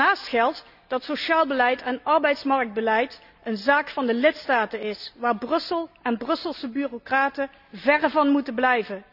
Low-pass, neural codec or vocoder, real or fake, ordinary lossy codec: 5.4 kHz; none; real; none